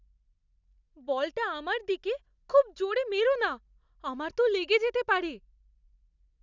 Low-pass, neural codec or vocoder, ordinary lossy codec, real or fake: 7.2 kHz; none; none; real